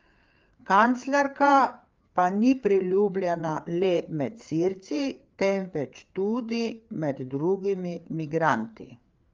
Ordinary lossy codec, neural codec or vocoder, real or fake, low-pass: Opus, 32 kbps; codec, 16 kHz, 4 kbps, FreqCodec, larger model; fake; 7.2 kHz